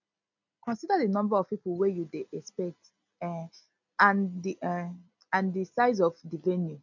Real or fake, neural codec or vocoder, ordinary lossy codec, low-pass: real; none; none; 7.2 kHz